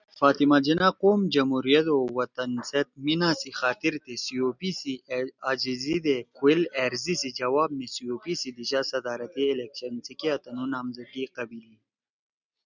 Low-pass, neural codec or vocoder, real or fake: 7.2 kHz; none; real